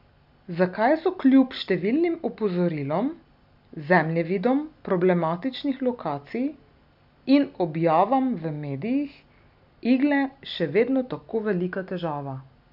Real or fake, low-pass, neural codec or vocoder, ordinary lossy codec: real; 5.4 kHz; none; none